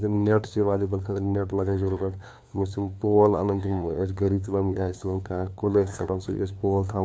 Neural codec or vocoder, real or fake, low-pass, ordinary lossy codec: codec, 16 kHz, 2 kbps, FunCodec, trained on LibriTTS, 25 frames a second; fake; none; none